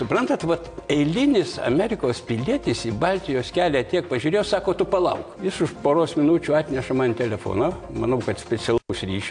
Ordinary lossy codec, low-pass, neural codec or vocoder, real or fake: AAC, 64 kbps; 9.9 kHz; none; real